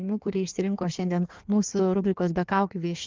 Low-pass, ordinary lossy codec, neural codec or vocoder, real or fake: 7.2 kHz; Opus, 32 kbps; codec, 16 kHz in and 24 kHz out, 1.1 kbps, FireRedTTS-2 codec; fake